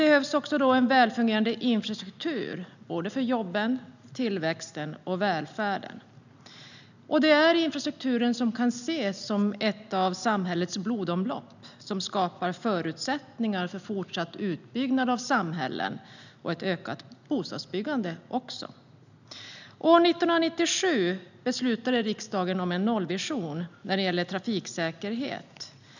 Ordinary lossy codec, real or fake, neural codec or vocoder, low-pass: none; real; none; 7.2 kHz